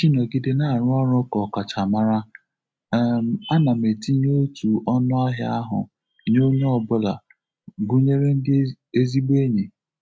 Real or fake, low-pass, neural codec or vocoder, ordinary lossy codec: real; none; none; none